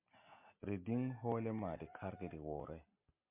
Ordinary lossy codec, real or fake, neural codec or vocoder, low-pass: MP3, 32 kbps; real; none; 3.6 kHz